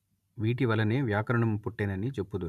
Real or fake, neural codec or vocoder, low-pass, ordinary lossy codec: real; none; 14.4 kHz; none